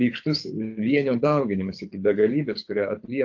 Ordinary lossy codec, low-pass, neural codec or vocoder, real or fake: AAC, 48 kbps; 7.2 kHz; codec, 24 kHz, 6 kbps, HILCodec; fake